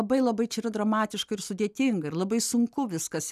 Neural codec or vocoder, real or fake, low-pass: none; real; 14.4 kHz